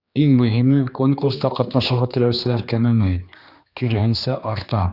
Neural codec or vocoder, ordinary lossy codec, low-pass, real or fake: codec, 16 kHz, 2 kbps, X-Codec, HuBERT features, trained on general audio; Opus, 64 kbps; 5.4 kHz; fake